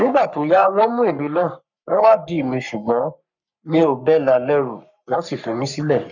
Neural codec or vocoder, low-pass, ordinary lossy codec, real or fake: codec, 44.1 kHz, 3.4 kbps, Pupu-Codec; 7.2 kHz; none; fake